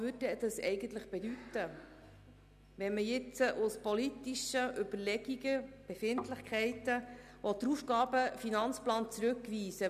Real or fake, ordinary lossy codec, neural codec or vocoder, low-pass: real; none; none; 14.4 kHz